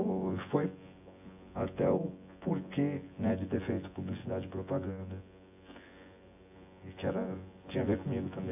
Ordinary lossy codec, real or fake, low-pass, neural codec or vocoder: AAC, 32 kbps; fake; 3.6 kHz; vocoder, 24 kHz, 100 mel bands, Vocos